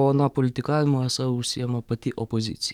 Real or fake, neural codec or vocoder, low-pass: fake; codec, 44.1 kHz, 7.8 kbps, DAC; 19.8 kHz